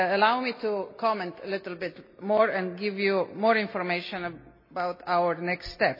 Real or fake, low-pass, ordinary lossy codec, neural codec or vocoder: real; 5.4 kHz; MP3, 24 kbps; none